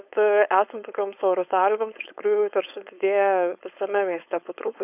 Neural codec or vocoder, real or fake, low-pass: codec, 16 kHz, 4.8 kbps, FACodec; fake; 3.6 kHz